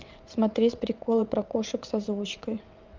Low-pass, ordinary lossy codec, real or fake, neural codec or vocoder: 7.2 kHz; Opus, 24 kbps; fake; vocoder, 22.05 kHz, 80 mel bands, WaveNeXt